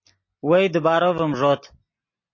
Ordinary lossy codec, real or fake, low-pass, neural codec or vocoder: MP3, 32 kbps; real; 7.2 kHz; none